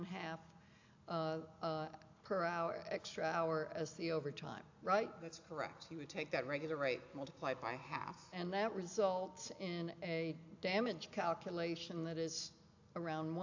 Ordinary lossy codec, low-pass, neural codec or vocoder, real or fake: AAC, 48 kbps; 7.2 kHz; none; real